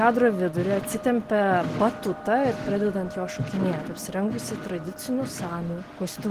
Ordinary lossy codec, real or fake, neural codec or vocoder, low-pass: Opus, 16 kbps; real; none; 14.4 kHz